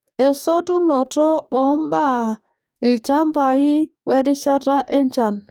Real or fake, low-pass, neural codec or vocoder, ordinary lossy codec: fake; 19.8 kHz; codec, 44.1 kHz, 2.6 kbps, DAC; none